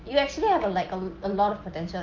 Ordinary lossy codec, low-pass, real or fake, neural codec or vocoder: Opus, 32 kbps; 7.2 kHz; real; none